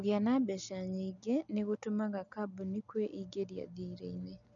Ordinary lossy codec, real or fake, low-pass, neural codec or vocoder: none; real; 7.2 kHz; none